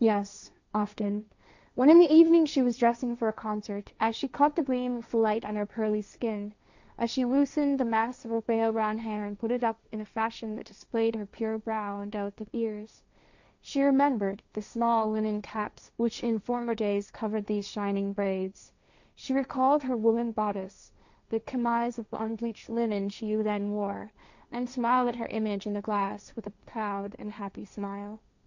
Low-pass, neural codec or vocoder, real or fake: 7.2 kHz; codec, 16 kHz, 1.1 kbps, Voila-Tokenizer; fake